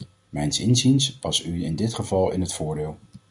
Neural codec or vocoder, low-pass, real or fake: none; 10.8 kHz; real